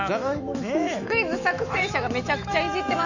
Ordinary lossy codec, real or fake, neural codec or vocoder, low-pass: none; fake; autoencoder, 48 kHz, 128 numbers a frame, DAC-VAE, trained on Japanese speech; 7.2 kHz